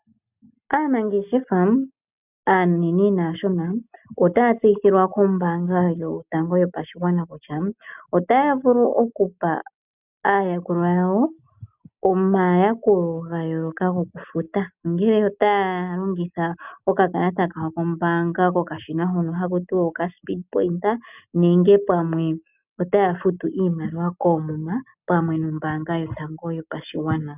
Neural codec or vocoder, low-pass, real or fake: none; 3.6 kHz; real